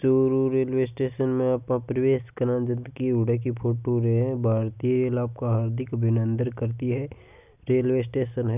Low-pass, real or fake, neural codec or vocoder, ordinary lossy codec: 3.6 kHz; real; none; none